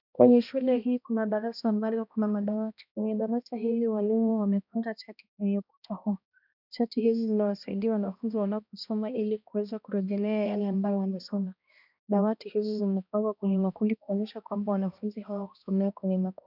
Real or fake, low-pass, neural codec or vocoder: fake; 5.4 kHz; codec, 16 kHz, 1 kbps, X-Codec, HuBERT features, trained on balanced general audio